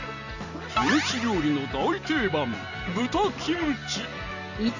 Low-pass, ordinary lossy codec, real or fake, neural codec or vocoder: 7.2 kHz; none; real; none